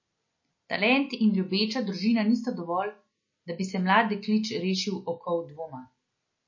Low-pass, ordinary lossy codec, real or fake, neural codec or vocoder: 7.2 kHz; MP3, 32 kbps; real; none